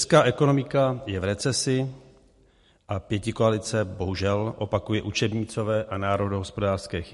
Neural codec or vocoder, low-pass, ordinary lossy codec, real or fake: none; 14.4 kHz; MP3, 48 kbps; real